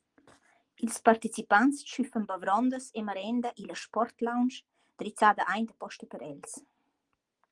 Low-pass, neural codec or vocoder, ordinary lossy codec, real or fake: 10.8 kHz; none; Opus, 24 kbps; real